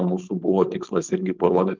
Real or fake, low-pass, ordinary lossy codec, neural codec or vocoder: fake; 7.2 kHz; Opus, 32 kbps; codec, 16 kHz, 4.8 kbps, FACodec